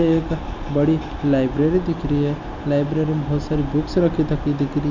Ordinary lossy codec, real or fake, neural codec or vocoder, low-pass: none; real; none; 7.2 kHz